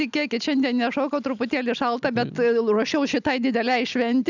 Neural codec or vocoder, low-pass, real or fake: none; 7.2 kHz; real